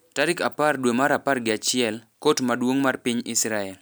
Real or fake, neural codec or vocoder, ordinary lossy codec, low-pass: real; none; none; none